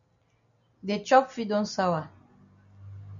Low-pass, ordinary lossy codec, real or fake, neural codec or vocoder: 7.2 kHz; MP3, 96 kbps; real; none